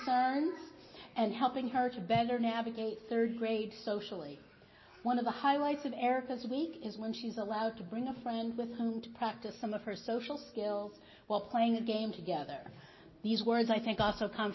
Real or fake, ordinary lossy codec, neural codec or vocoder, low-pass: real; MP3, 24 kbps; none; 7.2 kHz